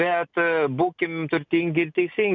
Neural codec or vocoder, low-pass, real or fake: none; 7.2 kHz; real